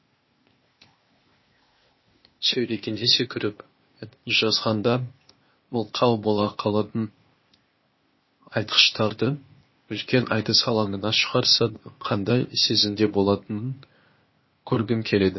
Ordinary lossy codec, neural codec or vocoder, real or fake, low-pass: MP3, 24 kbps; codec, 16 kHz, 0.8 kbps, ZipCodec; fake; 7.2 kHz